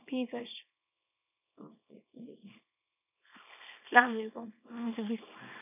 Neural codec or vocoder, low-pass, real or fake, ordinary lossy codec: codec, 24 kHz, 0.9 kbps, WavTokenizer, small release; 3.6 kHz; fake; none